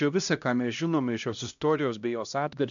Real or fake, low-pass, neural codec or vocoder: fake; 7.2 kHz; codec, 16 kHz, 1 kbps, X-Codec, HuBERT features, trained on LibriSpeech